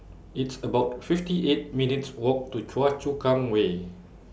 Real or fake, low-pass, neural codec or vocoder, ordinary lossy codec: real; none; none; none